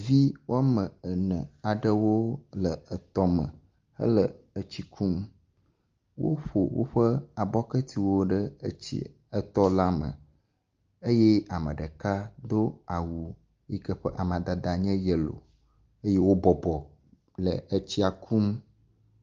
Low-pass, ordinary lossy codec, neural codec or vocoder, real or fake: 7.2 kHz; Opus, 24 kbps; none; real